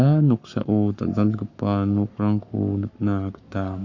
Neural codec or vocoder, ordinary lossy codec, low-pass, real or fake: codec, 44.1 kHz, 7.8 kbps, Pupu-Codec; none; 7.2 kHz; fake